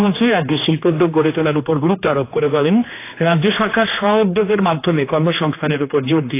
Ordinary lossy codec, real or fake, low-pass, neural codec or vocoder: AAC, 24 kbps; fake; 3.6 kHz; codec, 16 kHz, 2 kbps, X-Codec, HuBERT features, trained on general audio